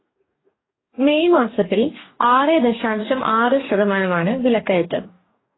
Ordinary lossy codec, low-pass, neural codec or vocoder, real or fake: AAC, 16 kbps; 7.2 kHz; codec, 44.1 kHz, 2.6 kbps, DAC; fake